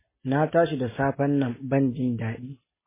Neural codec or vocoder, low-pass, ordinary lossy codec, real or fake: none; 3.6 kHz; MP3, 16 kbps; real